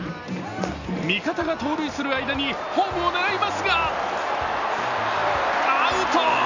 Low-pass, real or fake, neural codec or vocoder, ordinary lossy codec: 7.2 kHz; real; none; none